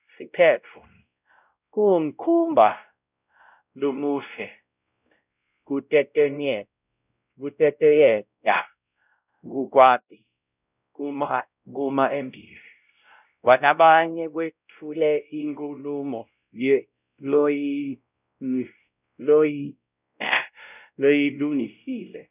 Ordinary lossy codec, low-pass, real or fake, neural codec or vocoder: none; 3.6 kHz; fake; codec, 16 kHz, 0.5 kbps, X-Codec, WavLM features, trained on Multilingual LibriSpeech